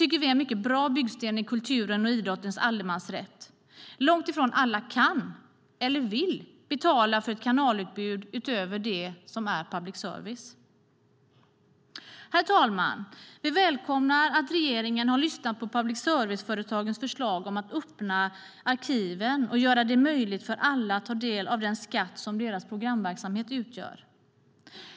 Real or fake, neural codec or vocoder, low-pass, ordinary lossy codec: real; none; none; none